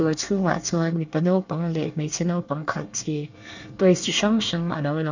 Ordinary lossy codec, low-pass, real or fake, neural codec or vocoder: none; 7.2 kHz; fake; codec, 24 kHz, 1 kbps, SNAC